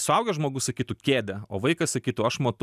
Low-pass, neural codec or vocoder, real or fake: 14.4 kHz; none; real